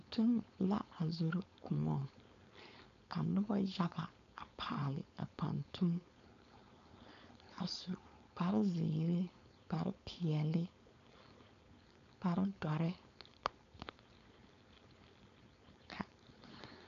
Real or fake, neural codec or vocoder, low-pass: fake; codec, 16 kHz, 4.8 kbps, FACodec; 7.2 kHz